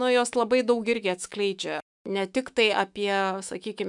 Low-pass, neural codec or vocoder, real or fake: 10.8 kHz; autoencoder, 48 kHz, 128 numbers a frame, DAC-VAE, trained on Japanese speech; fake